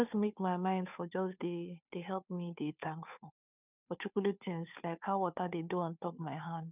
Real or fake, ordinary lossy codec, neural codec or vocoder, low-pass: fake; none; codec, 16 kHz, 2 kbps, FunCodec, trained on Chinese and English, 25 frames a second; 3.6 kHz